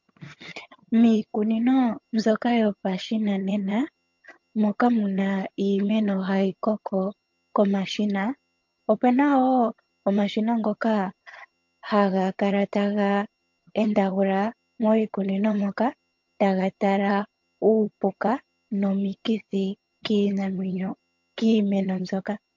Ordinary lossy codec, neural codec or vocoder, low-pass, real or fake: MP3, 48 kbps; vocoder, 22.05 kHz, 80 mel bands, HiFi-GAN; 7.2 kHz; fake